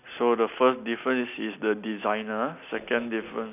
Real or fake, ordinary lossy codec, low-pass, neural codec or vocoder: real; none; 3.6 kHz; none